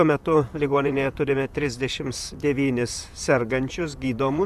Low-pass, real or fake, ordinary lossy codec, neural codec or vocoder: 14.4 kHz; fake; MP3, 96 kbps; vocoder, 44.1 kHz, 128 mel bands, Pupu-Vocoder